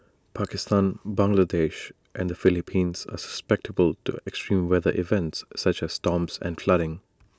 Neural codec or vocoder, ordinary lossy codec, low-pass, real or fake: none; none; none; real